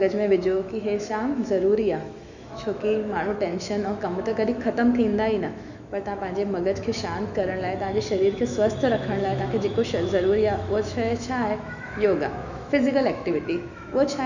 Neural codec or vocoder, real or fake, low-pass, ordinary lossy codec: autoencoder, 48 kHz, 128 numbers a frame, DAC-VAE, trained on Japanese speech; fake; 7.2 kHz; none